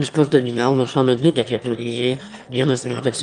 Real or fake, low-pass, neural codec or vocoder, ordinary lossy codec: fake; 9.9 kHz; autoencoder, 22.05 kHz, a latent of 192 numbers a frame, VITS, trained on one speaker; Opus, 32 kbps